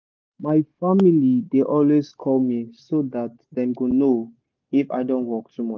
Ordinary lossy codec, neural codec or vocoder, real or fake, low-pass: Opus, 32 kbps; none; real; 7.2 kHz